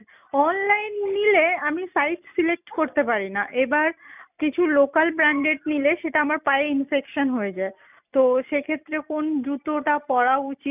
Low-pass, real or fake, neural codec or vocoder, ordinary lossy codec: 3.6 kHz; real; none; none